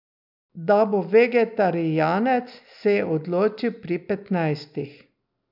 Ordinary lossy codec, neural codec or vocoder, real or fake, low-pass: none; none; real; 5.4 kHz